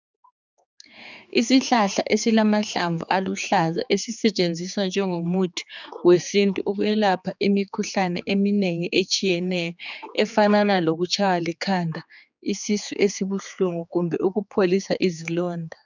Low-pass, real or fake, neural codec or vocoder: 7.2 kHz; fake; codec, 16 kHz, 4 kbps, X-Codec, HuBERT features, trained on general audio